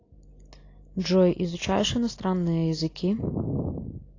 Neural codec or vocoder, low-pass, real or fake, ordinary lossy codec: none; 7.2 kHz; real; AAC, 32 kbps